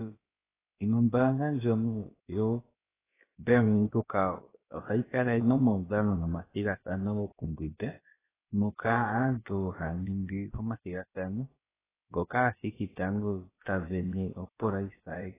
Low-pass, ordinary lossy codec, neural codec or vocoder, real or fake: 3.6 kHz; AAC, 16 kbps; codec, 16 kHz, about 1 kbps, DyCAST, with the encoder's durations; fake